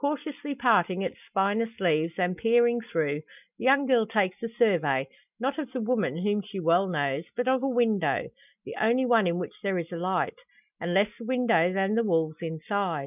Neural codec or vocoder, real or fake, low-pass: none; real; 3.6 kHz